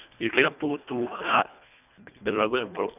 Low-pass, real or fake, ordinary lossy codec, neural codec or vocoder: 3.6 kHz; fake; none; codec, 24 kHz, 1.5 kbps, HILCodec